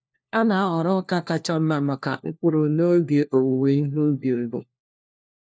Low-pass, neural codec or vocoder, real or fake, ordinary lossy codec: none; codec, 16 kHz, 1 kbps, FunCodec, trained on LibriTTS, 50 frames a second; fake; none